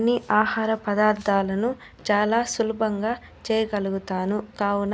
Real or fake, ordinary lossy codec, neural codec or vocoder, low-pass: real; none; none; none